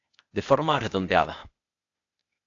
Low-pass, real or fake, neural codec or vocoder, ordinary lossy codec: 7.2 kHz; fake; codec, 16 kHz, 0.8 kbps, ZipCodec; AAC, 48 kbps